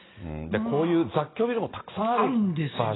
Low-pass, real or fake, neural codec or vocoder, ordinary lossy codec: 7.2 kHz; real; none; AAC, 16 kbps